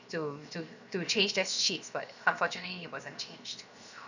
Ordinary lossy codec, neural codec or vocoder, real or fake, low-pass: none; codec, 16 kHz, 0.7 kbps, FocalCodec; fake; 7.2 kHz